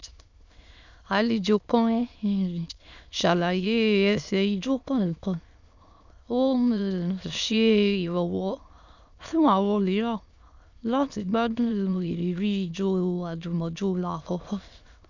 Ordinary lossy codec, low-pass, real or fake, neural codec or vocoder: none; 7.2 kHz; fake; autoencoder, 22.05 kHz, a latent of 192 numbers a frame, VITS, trained on many speakers